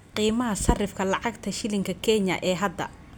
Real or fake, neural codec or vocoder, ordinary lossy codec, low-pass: real; none; none; none